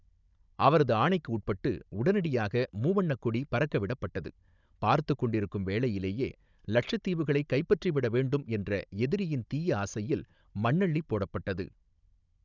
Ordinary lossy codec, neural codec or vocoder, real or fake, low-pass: none; codec, 16 kHz, 16 kbps, FunCodec, trained on Chinese and English, 50 frames a second; fake; 7.2 kHz